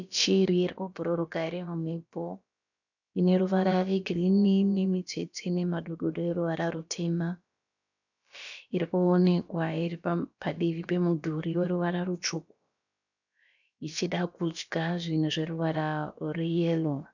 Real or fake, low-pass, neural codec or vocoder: fake; 7.2 kHz; codec, 16 kHz, about 1 kbps, DyCAST, with the encoder's durations